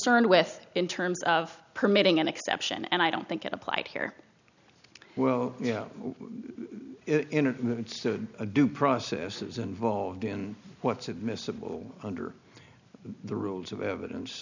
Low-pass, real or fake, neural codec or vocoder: 7.2 kHz; real; none